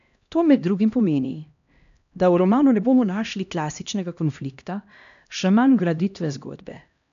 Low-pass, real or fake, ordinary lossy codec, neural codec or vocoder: 7.2 kHz; fake; AAC, 96 kbps; codec, 16 kHz, 1 kbps, X-Codec, HuBERT features, trained on LibriSpeech